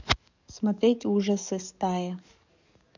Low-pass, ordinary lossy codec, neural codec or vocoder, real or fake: 7.2 kHz; none; codec, 44.1 kHz, 7.8 kbps, DAC; fake